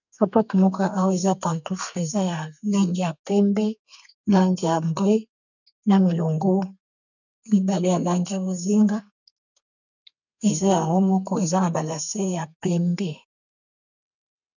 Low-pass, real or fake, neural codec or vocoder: 7.2 kHz; fake; codec, 32 kHz, 1.9 kbps, SNAC